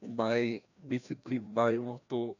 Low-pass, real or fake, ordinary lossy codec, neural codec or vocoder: 7.2 kHz; fake; none; codec, 16 kHz, 1 kbps, FreqCodec, larger model